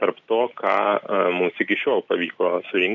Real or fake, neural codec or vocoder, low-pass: real; none; 7.2 kHz